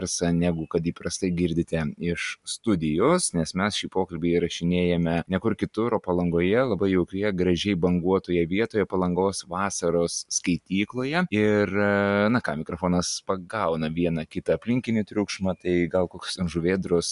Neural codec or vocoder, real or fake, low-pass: none; real; 10.8 kHz